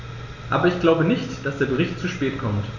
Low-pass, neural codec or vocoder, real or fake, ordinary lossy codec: 7.2 kHz; none; real; none